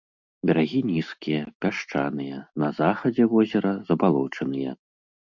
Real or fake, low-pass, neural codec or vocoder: real; 7.2 kHz; none